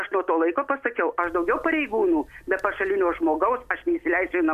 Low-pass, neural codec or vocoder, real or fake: 14.4 kHz; none; real